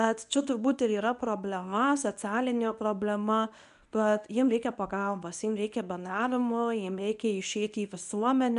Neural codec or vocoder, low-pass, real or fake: codec, 24 kHz, 0.9 kbps, WavTokenizer, medium speech release version 1; 10.8 kHz; fake